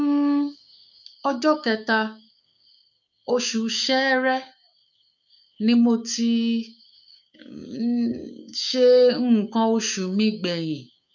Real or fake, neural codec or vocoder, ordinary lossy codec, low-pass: fake; codec, 16 kHz, 6 kbps, DAC; none; 7.2 kHz